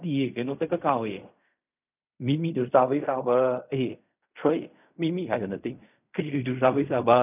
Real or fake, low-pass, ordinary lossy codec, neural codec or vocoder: fake; 3.6 kHz; none; codec, 16 kHz in and 24 kHz out, 0.4 kbps, LongCat-Audio-Codec, fine tuned four codebook decoder